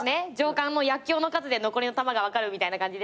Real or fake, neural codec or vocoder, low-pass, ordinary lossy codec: real; none; none; none